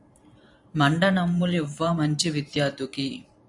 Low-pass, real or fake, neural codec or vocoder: 10.8 kHz; fake; vocoder, 44.1 kHz, 128 mel bands every 512 samples, BigVGAN v2